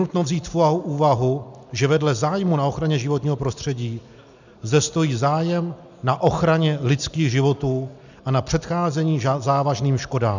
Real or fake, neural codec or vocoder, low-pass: real; none; 7.2 kHz